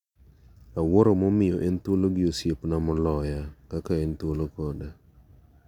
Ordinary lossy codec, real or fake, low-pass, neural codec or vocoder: none; real; 19.8 kHz; none